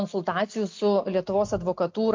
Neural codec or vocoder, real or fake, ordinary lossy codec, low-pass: none; real; MP3, 48 kbps; 7.2 kHz